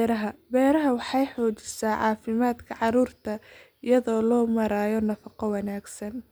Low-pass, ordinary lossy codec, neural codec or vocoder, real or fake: none; none; none; real